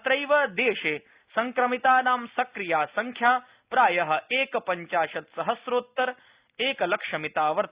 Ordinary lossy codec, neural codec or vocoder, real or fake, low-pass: Opus, 64 kbps; none; real; 3.6 kHz